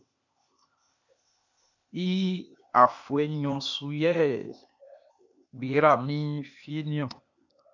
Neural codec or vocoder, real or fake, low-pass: codec, 16 kHz, 0.8 kbps, ZipCodec; fake; 7.2 kHz